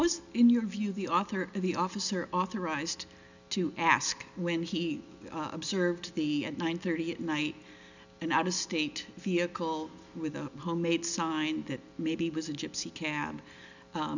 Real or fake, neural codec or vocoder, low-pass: real; none; 7.2 kHz